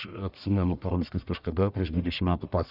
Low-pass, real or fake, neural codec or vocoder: 5.4 kHz; fake; codec, 44.1 kHz, 1.7 kbps, Pupu-Codec